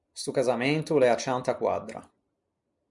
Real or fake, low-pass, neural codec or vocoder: real; 10.8 kHz; none